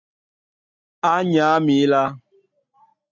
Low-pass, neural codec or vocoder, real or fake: 7.2 kHz; none; real